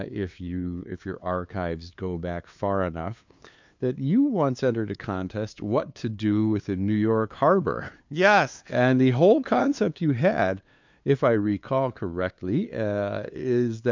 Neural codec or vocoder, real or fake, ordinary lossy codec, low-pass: codec, 16 kHz, 2 kbps, FunCodec, trained on LibriTTS, 25 frames a second; fake; MP3, 64 kbps; 7.2 kHz